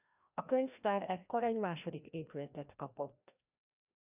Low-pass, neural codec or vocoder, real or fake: 3.6 kHz; codec, 16 kHz, 1 kbps, FreqCodec, larger model; fake